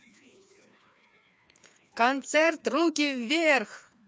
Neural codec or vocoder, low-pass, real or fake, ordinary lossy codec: codec, 16 kHz, 2 kbps, FreqCodec, larger model; none; fake; none